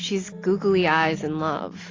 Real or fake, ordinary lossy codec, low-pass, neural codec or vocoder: real; AAC, 32 kbps; 7.2 kHz; none